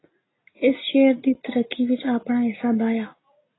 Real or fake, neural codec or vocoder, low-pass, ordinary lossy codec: real; none; 7.2 kHz; AAC, 16 kbps